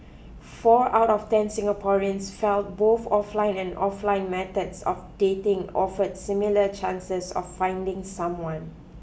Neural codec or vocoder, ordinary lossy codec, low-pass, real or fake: none; none; none; real